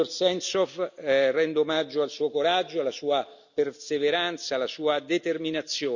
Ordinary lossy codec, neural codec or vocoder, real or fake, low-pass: none; none; real; 7.2 kHz